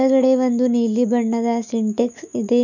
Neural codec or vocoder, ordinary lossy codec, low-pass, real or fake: autoencoder, 48 kHz, 128 numbers a frame, DAC-VAE, trained on Japanese speech; none; 7.2 kHz; fake